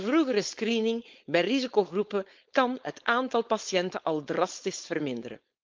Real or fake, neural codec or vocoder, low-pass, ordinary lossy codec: fake; codec, 16 kHz, 4.8 kbps, FACodec; 7.2 kHz; Opus, 32 kbps